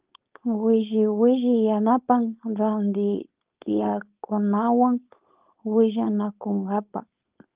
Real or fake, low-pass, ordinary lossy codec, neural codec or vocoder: fake; 3.6 kHz; Opus, 32 kbps; codec, 16 kHz, 4.8 kbps, FACodec